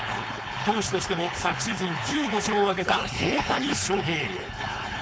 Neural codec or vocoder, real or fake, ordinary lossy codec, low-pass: codec, 16 kHz, 4.8 kbps, FACodec; fake; none; none